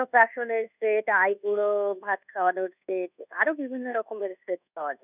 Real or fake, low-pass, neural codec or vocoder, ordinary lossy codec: fake; 3.6 kHz; codec, 24 kHz, 1.2 kbps, DualCodec; none